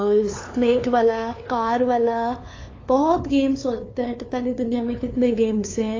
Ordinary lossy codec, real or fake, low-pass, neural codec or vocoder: AAC, 32 kbps; fake; 7.2 kHz; codec, 16 kHz, 2 kbps, FunCodec, trained on LibriTTS, 25 frames a second